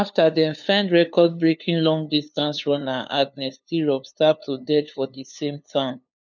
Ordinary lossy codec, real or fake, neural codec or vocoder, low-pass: none; fake; codec, 16 kHz, 2 kbps, FunCodec, trained on LibriTTS, 25 frames a second; 7.2 kHz